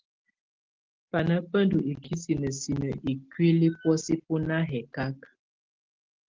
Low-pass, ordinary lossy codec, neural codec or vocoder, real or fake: 7.2 kHz; Opus, 16 kbps; none; real